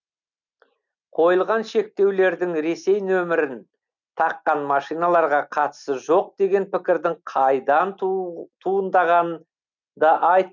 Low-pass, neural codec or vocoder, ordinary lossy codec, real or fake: 7.2 kHz; none; none; real